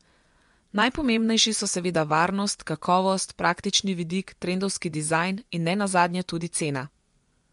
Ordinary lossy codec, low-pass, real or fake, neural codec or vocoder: MP3, 64 kbps; 10.8 kHz; fake; vocoder, 24 kHz, 100 mel bands, Vocos